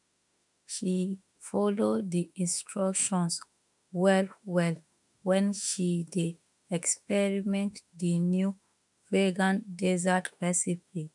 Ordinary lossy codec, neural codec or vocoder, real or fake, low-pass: none; autoencoder, 48 kHz, 32 numbers a frame, DAC-VAE, trained on Japanese speech; fake; 10.8 kHz